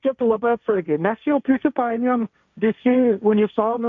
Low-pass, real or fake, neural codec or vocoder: 7.2 kHz; fake; codec, 16 kHz, 1.1 kbps, Voila-Tokenizer